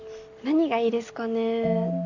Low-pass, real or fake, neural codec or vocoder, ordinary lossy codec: 7.2 kHz; real; none; none